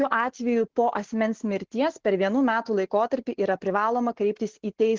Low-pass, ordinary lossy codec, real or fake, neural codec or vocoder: 7.2 kHz; Opus, 16 kbps; real; none